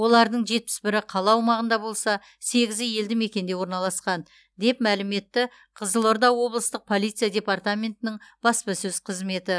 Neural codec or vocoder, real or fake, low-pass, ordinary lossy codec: none; real; none; none